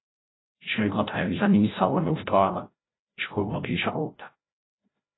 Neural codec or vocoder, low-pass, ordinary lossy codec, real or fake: codec, 16 kHz, 0.5 kbps, FreqCodec, larger model; 7.2 kHz; AAC, 16 kbps; fake